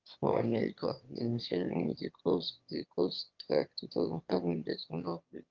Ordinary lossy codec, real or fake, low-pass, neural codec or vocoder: Opus, 32 kbps; fake; 7.2 kHz; autoencoder, 22.05 kHz, a latent of 192 numbers a frame, VITS, trained on one speaker